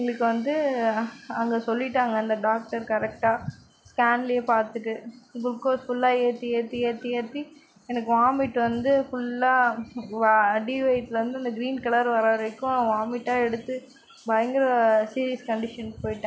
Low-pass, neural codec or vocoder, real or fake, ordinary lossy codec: none; none; real; none